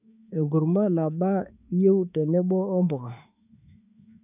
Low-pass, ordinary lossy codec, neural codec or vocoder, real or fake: 3.6 kHz; none; autoencoder, 48 kHz, 32 numbers a frame, DAC-VAE, trained on Japanese speech; fake